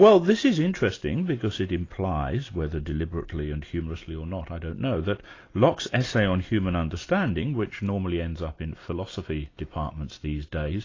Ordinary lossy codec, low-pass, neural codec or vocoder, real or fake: AAC, 32 kbps; 7.2 kHz; none; real